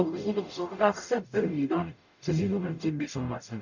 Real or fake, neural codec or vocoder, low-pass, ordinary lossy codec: fake; codec, 44.1 kHz, 0.9 kbps, DAC; 7.2 kHz; none